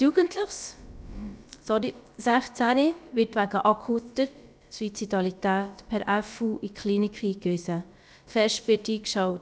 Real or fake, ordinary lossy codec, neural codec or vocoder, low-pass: fake; none; codec, 16 kHz, about 1 kbps, DyCAST, with the encoder's durations; none